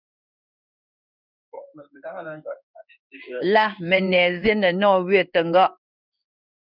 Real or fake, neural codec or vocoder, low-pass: fake; codec, 16 kHz in and 24 kHz out, 1 kbps, XY-Tokenizer; 5.4 kHz